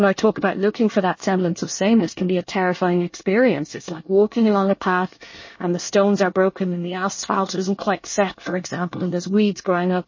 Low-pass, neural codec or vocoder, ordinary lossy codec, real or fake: 7.2 kHz; codec, 24 kHz, 1 kbps, SNAC; MP3, 32 kbps; fake